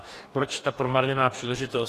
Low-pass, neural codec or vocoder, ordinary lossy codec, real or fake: 14.4 kHz; codec, 44.1 kHz, 2.6 kbps, DAC; AAC, 48 kbps; fake